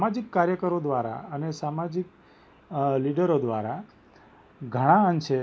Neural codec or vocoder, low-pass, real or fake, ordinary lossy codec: none; none; real; none